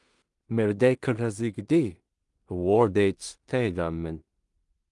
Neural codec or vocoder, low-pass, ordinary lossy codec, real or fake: codec, 16 kHz in and 24 kHz out, 0.4 kbps, LongCat-Audio-Codec, two codebook decoder; 10.8 kHz; Opus, 32 kbps; fake